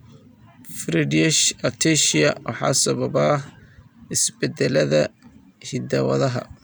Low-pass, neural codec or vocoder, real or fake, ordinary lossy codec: none; none; real; none